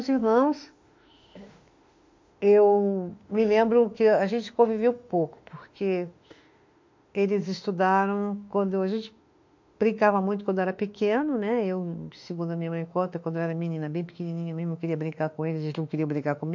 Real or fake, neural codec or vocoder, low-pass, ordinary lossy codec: fake; autoencoder, 48 kHz, 32 numbers a frame, DAC-VAE, trained on Japanese speech; 7.2 kHz; MP3, 48 kbps